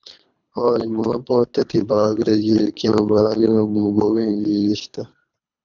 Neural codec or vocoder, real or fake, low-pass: codec, 24 kHz, 3 kbps, HILCodec; fake; 7.2 kHz